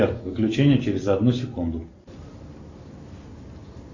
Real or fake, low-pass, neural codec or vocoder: real; 7.2 kHz; none